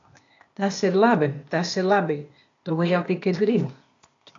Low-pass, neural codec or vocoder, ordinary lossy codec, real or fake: 7.2 kHz; codec, 16 kHz, 0.8 kbps, ZipCodec; AAC, 48 kbps; fake